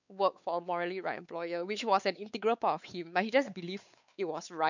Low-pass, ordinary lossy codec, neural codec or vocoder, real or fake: 7.2 kHz; none; codec, 16 kHz, 4 kbps, X-Codec, WavLM features, trained on Multilingual LibriSpeech; fake